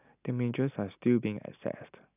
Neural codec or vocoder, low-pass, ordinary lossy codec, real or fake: none; 3.6 kHz; none; real